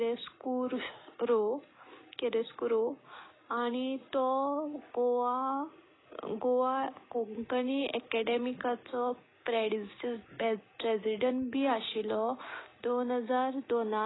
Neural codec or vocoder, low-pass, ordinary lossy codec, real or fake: none; 7.2 kHz; AAC, 16 kbps; real